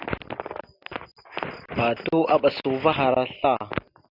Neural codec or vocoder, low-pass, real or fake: none; 5.4 kHz; real